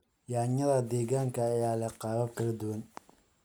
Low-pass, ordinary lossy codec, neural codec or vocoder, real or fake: none; none; none; real